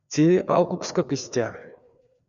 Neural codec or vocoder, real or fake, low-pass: codec, 16 kHz, 2 kbps, FreqCodec, larger model; fake; 7.2 kHz